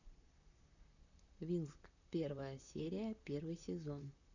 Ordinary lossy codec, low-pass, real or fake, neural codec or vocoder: none; 7.2 kHz; fake; vocoder, 44.1 kHz, 128 mel bands, Pupu-Vocoder